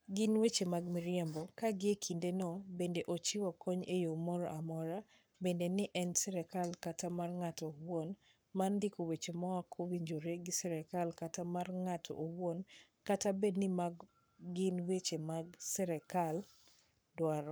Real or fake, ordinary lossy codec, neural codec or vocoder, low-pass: fake; none; codec, 44.1 kHz, 7.8 kbps, Pupu-Codec; none